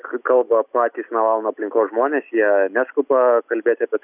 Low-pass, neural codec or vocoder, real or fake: 3.6 kHz; none; real